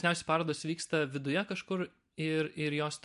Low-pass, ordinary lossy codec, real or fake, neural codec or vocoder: 10.8 kHz; MP3, 64 kbps; real; none